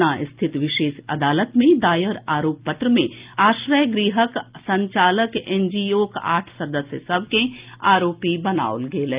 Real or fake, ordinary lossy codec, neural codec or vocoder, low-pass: real; Opus, 32 kbps; none; 3.6 kHz